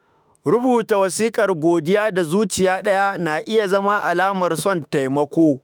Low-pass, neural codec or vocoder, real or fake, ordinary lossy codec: none; autoencoder, 48 kHz, 32 numbers a frame, DAC-VAE, trained on Japanese speech; fake; none